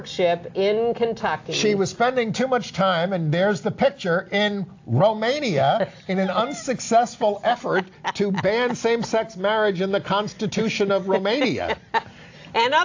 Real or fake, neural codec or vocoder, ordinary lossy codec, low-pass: real; none; AAC, 48 kbps; 7.2 kHz